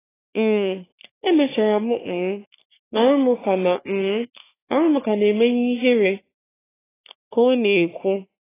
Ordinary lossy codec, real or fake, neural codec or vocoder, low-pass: AAC, 16 kbps; fake; codec, 44.1 kHz, 3.4 kbps, Pupu-Codec; 3.6 kHz